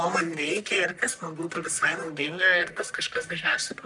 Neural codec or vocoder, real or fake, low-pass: codec, 44.1 kHz, 1.7 kbps, Pupu-Codec; fake; 10.8 kHz